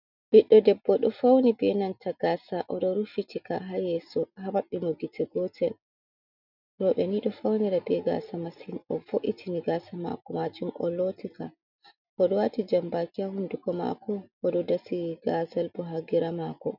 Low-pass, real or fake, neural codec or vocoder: 5.4 kHz; real; none